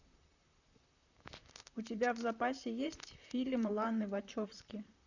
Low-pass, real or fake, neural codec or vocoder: 7.2 kHz; fake; vocoder, 44.1 kHz, 128 mel bands, Pupu-Vocoder